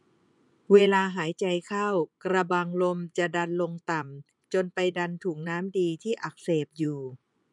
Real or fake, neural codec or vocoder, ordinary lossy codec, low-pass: fake; vocoder, 24 kHz, 100 mel bands, Vocos; none; 10.8 kHz